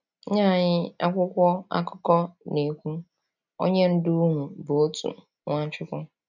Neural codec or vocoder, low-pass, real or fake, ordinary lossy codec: none; 7.2 kHz; real; none